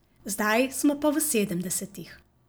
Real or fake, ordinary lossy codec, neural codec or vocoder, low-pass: real; none; none; none